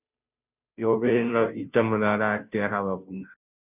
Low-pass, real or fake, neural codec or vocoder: 3.6 kHz; fake; codec, 16 kHz, 0.5 kbps, FunCodec, trained on Chinese and English, 25 frames a second